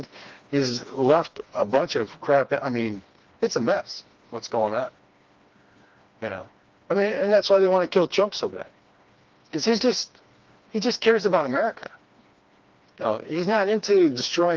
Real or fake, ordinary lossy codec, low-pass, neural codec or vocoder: fake; Opus, 32 kbps; 7.2 kHz; codec, 16 kHz, 2 kbps, FreqCodec, smaller model